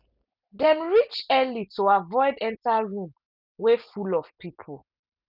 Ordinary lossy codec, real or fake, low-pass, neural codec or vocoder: none; real; 5.4 kHz; none